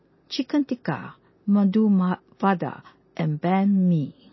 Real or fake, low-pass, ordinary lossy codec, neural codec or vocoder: real; 7.2 kHz; MP3, 24 kbps; none